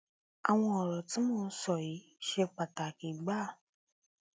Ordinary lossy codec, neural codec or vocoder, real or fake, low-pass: none; none; real; none